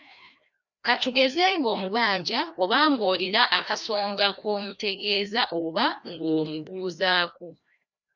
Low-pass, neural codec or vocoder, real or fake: 7.2 kHz; codec, 16 kHz, 1 kbps, FreqCodec, larger model; fake